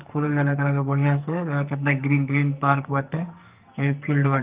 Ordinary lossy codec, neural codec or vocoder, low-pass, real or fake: Opus, 32 kbps; codec, 44.1 kHz, 2.6 kbps, SNAC; 3.6 kHz; fake